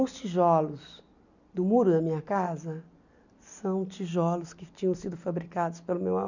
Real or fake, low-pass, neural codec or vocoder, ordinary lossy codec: real; 7.2 kHz; none; none